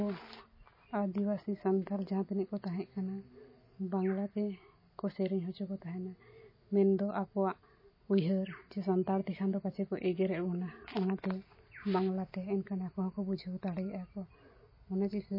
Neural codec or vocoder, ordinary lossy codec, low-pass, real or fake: none; MP3, 24 kbps; 5.4 kHz; real